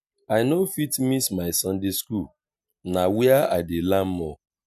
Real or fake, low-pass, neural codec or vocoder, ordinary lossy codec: real; 14.4 kHz; none; none